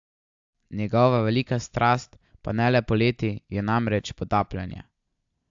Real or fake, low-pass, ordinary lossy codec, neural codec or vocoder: real; 7.2 kHz; AAC, 64 kbps; none